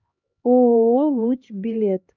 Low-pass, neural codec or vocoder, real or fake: 7.2 kHz; codec, 16 kHz, 2 kbps, X-Codec, HuBERT features, trained on LibriSpeech; fake